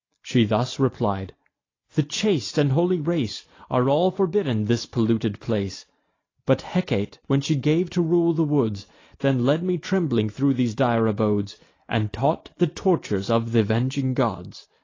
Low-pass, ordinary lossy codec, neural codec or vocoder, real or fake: 7.2 kHz; AAC, 32 kbps; none; real